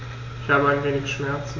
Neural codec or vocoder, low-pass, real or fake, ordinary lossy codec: none; 7.2 kHz; real; AAC, 32 kbps